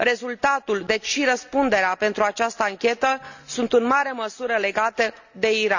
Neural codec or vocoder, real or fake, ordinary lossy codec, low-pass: none; real; none; 7.2 kHz